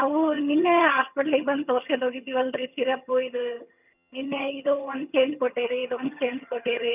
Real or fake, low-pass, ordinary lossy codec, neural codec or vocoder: fake; 3.6 kHz; none; vocoder, 22.05 kHz, 80 mel bands, HiFi-GAN